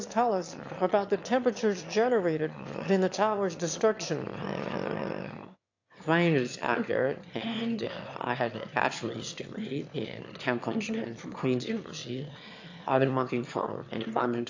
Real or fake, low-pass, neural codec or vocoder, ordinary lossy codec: fake; 7.2 kHz; autoencoder, 22.05 kHz, a latent of 192 numbers a frame, VITS, trained on one speaker; AAC, 48 kbps